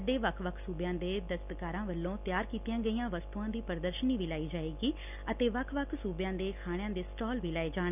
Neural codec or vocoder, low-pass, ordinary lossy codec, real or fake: none; 3.6 kHz; none; real